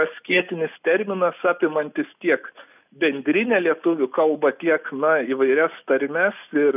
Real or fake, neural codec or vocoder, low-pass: real; none; 3.6 kHz